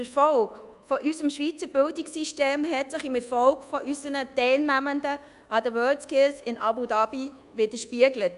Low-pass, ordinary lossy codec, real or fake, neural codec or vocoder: 10.8 kHz; none; fake; codec, 24 kHz, 1.2 kbps, DualCodec